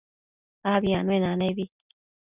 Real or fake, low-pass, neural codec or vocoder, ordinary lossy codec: real; 3.6 kHz; none; Opus, 64 kbps